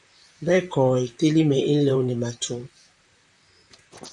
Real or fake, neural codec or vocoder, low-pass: fake; vocoder, 44.1 kHz, 128 mel bands, Pupu-Vocoder; 10.8 kHz